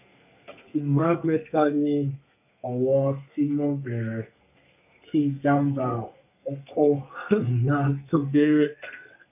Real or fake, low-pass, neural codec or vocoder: fake; 3.6 kHz; codec, 32 kHz, 1.9 kbps, SNAC